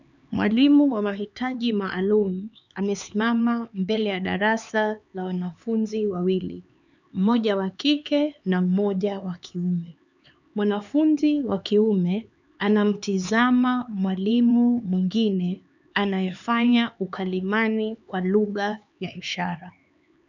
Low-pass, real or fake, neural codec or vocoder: 7.2 kHz; fake; codec, 16 kHz, 4 kbps, X-Codec, HuBERT features, trained on LibriSpeech